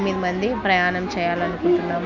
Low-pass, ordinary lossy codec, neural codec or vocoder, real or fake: 7.2 kHz; none; none; real